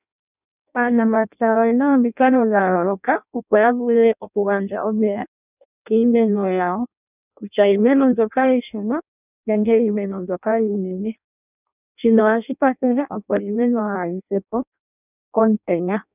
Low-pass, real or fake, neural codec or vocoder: 3.6 kHz; fake; codec, 16 kHz in and 24 kHz out, 0.6 kbps, FireRedTTS-2 codec